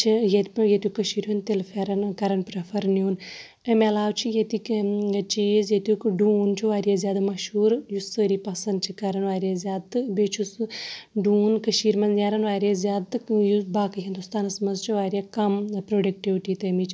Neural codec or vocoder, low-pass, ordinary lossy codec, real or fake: none; none; none; real